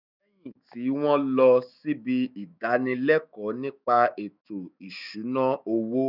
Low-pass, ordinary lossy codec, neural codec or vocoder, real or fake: 5.4 kHz; none; autoencoder, 48 kHz, 128 numbers a frame, DAC-VAE, trained on Japanese speech; fake